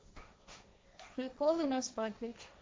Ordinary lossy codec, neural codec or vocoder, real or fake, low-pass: none; codec, 16 kHz, 1.1 kbps, Voila-Tokenizer; fake; none